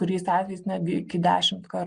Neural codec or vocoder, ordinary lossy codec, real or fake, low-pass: none; Opus, 24 kbps; real; 9.9 kHz